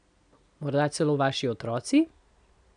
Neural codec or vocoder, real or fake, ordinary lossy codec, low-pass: none; real; none; 9.9 kHz